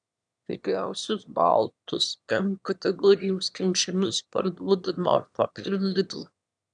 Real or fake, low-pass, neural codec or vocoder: fake; 9.9 kHz; autoencoder, 22.05 kHz, a latent of 192 numbers a frame, VITS, trained on one speaker